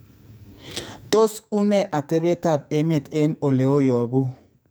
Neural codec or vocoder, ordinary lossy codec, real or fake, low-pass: codec, 44.1 kHz, 2.6 kbps, SNAC; none; fake; none